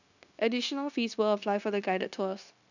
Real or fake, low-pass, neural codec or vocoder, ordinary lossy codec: fake; 7.2 kHz; codec, 16 kHz, 0.9 kbps, LongCat-Audio-Codec; none